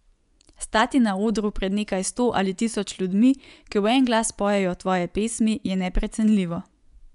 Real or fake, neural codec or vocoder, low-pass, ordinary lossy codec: fake; vocoder, 24 kHz, 100 mel bands, Vocos; 10.8 kHz; none